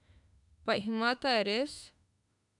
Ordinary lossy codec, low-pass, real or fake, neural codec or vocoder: none; 10.8 kHz; fake; autoencoder, 48 kHz, 32 numbers a frame, DAC-VAE, trained on Japanese speech